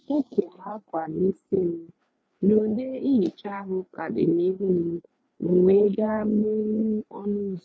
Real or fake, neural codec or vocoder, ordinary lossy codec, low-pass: fake; codec, 16 kHz, 4 kbps, FreqCodec, larger model; none; none